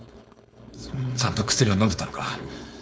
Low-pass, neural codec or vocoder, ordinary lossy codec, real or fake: none; codec, 16 kHz, 4.8 kbps, FACodec; none; fake